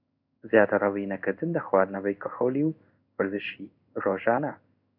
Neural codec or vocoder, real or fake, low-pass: codec, 16 kHz in and 24 kHz out, 1 kbps, XY-Tokenizer; fake; 5.4 kHz